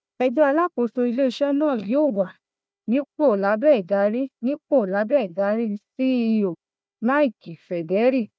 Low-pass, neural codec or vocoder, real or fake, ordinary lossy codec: none; codec, 16 kHz, 1 kbps, FunCodec, trained on Chinese and English, 50 frames a second; fake; none